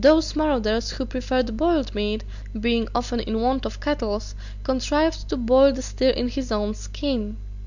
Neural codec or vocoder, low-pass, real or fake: none; 7.2 kHz; real